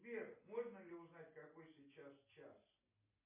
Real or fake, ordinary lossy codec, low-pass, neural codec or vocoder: real; MP3, 24 kbps; 3.6 kHz; none